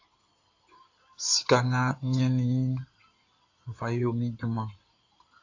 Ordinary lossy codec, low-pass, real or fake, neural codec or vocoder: AAC, 48 kbps; 7.2 kHz; fake; codec, 16 kHz in and 24 kHz out, 2.2 kbps, FireRedTTS-2 codec